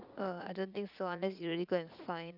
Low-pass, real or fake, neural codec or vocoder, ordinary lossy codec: 5.4 kHz; fake; vocoder, 44.1 kHz, 80 mel bands, Vocos; none